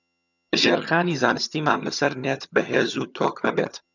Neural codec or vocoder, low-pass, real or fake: vocoder, 22.05 kHz, 80 mel bands, HiFi-GAN; 7.2 kHz; fake